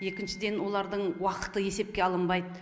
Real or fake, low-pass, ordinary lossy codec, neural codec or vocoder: real; none; none; none